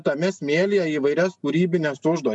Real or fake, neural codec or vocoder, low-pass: real; none; 10.8 kHz